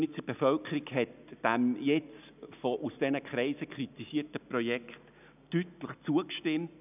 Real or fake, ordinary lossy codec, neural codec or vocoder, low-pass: real; none; none; 3.6 kHz